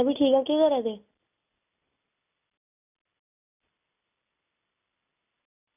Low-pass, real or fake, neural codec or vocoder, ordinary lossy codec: 3.6 kHz; real; none; none